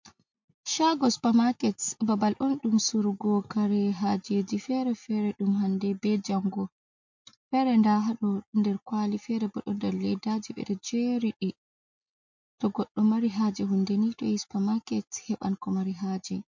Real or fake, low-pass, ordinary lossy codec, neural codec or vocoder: real; 7.2 kHz; MP3, 48 kbps; none